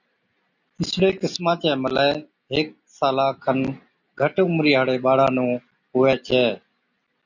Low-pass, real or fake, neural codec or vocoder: 7.2 kHz; real; none